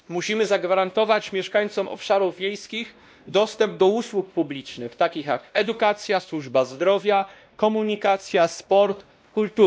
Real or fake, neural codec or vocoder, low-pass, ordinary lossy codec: fake; codec, 16 kHz, 1 kbps, X-Codec, WavLM features, trained on Multilingual LibriSpeech; none; none